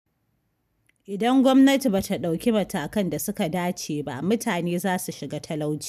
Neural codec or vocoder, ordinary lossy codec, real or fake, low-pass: none; none; real; 14.4 kHz